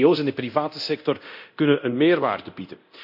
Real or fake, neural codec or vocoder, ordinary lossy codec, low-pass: fake; codec, 24 kHz, 0.9 kbps, DualCodec; none; 5.4 kHz